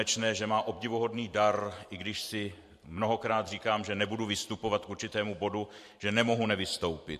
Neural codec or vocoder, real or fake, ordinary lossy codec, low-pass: vocoder, 44.1 kHz, 128 mel bands every 512 samples, BigVGAN v2; fake; MP3, 64 kbps; 14.4 kHz